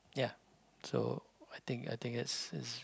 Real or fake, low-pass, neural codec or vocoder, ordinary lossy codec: real; none; none; none